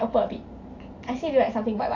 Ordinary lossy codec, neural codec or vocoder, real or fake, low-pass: AAC, 48 kbps; none; real; 7.2 kHz